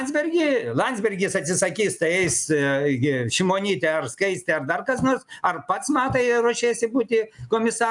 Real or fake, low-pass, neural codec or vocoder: real; 10.8 kHz; none